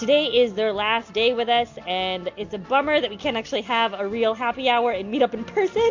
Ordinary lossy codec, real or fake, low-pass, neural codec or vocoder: MP3, 48 kbps; real; 7.2 kHz; none